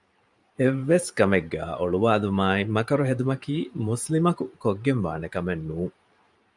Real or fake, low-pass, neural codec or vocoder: fake; 10.8 kHz; vocoder, 44.1 kHz, 128 mel bands every 512 samples, BigVGAN v2